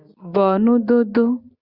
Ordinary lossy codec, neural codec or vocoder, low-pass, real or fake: Opus, 64 kbps; none; 5.4 kHz; real